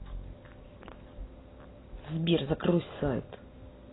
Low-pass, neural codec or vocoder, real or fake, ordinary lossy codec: 7.2 kHz; none; real; AAC, 16 kbps